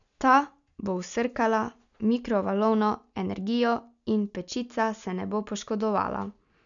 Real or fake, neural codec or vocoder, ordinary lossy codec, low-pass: real; none; none; 7.2 kHz